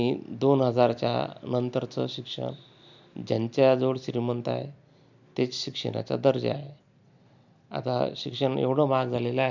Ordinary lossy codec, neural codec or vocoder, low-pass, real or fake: none; none; 7.2 kHz; real